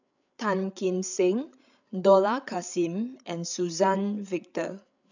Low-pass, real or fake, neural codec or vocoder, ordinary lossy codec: 7.2 kHz; fake; codec, 16 kHz, 8 kbps, FreqCodec, larger model; none